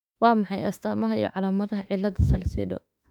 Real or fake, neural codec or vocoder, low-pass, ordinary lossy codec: fake; autoencoder, 48 kHz, 32 numbers a frame, DAC-VAE, trained on Japanese speech; 19.8 kHz; none